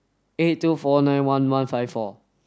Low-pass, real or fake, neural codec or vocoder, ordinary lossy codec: none; real; none; none